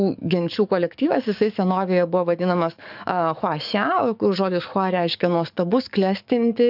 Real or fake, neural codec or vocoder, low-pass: fake; codec, 44.1 kHz, 7.8 kbps, DAC; 5.4 kHz